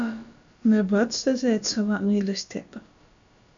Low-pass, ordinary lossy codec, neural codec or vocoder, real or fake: 7.2 kHz; MP3, 64 kbps; codec, 16 kHz, about 1 kbps, DyCAST, with the encoder's durations; fake